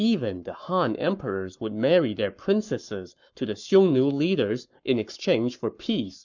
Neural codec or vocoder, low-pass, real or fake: codec, 44.1 kHz, 7.8 kbps, Pupu-Codec; 7.2 kHz; fake